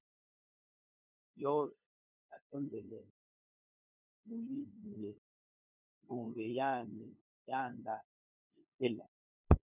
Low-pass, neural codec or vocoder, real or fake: 3.6 kHz; codec, 16 kHz, 2 kbps, FunCodec, trained on LibriTTS, 25 frames a second; fake